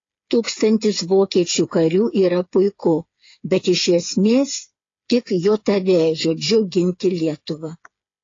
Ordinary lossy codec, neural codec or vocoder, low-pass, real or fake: AAC, 32 kbps; codec, 16 kHz, 8 kbps, FreqCodec, smaller model; 7.2 kHz; fake